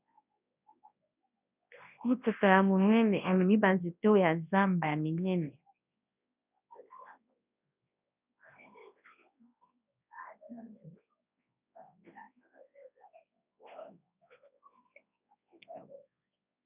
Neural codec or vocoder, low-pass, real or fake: codec, 24 kHz, 0.9 kbps, WavTokenizer, large speech release; 3.6 kHz; fake